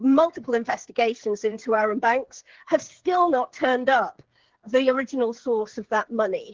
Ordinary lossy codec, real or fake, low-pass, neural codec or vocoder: Opus, 16 kbps; fake; 7.2 kHz; codec, 16 kHz, 8 kbps, FreqCodec, smaller model